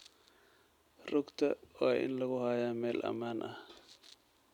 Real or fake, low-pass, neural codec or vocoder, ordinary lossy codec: real; 19.8 kHz; none; none